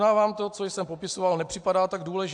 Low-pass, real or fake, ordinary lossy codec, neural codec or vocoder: 10.8 kHz; real; AAC, 64 kbps; none